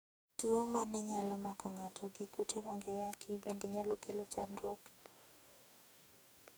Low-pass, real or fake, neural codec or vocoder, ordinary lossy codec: none; fake; codec, 44.1 kHz, 2.6 kbps, DAC; none